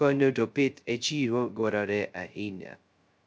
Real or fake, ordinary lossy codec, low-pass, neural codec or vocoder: fake; none; none; codec, 16 kHz, 0.2 kbps, FocalCodec